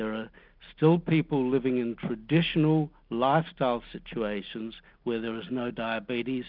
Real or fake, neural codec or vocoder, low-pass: real; none; 5.4 kHz